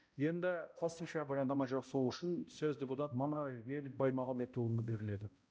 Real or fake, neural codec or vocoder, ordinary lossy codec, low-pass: fake; codec, 16 kHz, 0.5 kbps, X-Codec, HuBERT features, trained on balanced general audio; none; none